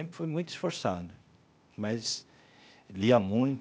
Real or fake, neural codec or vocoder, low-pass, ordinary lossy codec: fake; codec, 16 kHz, 0.8 kbps, ZipCodec; none; none